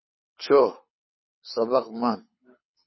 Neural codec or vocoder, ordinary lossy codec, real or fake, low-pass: codec, 24 kHz, 6 kbps, HILCodec; MP3, 24 kbps; fake; 7.2 kHz